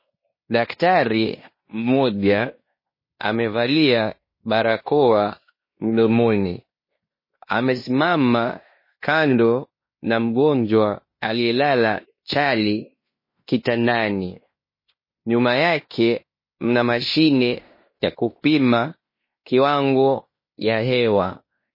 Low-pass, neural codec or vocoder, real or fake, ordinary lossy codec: 5.4 kHz; codec, 16 kHz in and 24 kHz out, 0.9 kbps, LongCat-Audio-Codec, fine tuned four codebook decoder; fake; MP3, 24 kbps